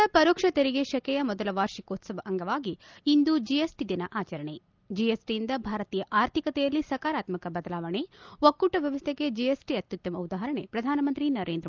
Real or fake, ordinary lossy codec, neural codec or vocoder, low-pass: real; Opus, 32 kbps; none; 7.2 kHz